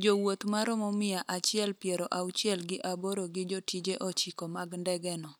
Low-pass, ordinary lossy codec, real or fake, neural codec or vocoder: none; none; real; none